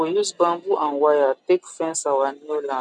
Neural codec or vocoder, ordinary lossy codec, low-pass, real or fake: none; none; none; real